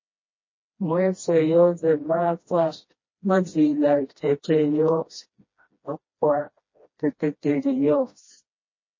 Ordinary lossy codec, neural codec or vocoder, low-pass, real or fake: MP3, 32 kbps; codec, 16 kHz, 1 kbps, FreqCodec, smaller model; 7.2 kHz; fake